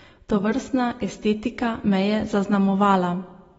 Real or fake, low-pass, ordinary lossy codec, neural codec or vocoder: real; 19.8 kHz; AAC, 24 kbps; none